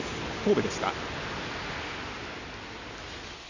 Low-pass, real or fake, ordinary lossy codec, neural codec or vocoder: 7.2 kHz; real; none; none